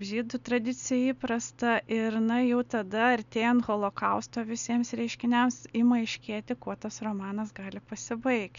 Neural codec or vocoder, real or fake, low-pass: none; real; 7.2 kHz